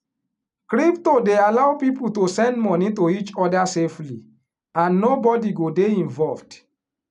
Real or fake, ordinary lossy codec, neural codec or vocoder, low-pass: real; none; none; 10.8 kHz